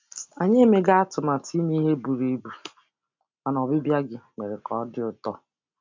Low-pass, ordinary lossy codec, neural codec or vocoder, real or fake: 7.2 kHz; MP3, 64 kbps; none; real